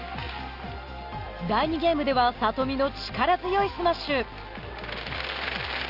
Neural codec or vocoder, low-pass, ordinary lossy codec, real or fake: none; 5.4 kHz; Opus, 24 kbps; real